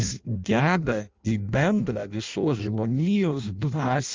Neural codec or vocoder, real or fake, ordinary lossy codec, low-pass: codec, 16 kHz in and 24 kHz out, 0.6 kbps, FireRedTTS-2 codec; fake; Opus, 32 kbps; 7.2 kHz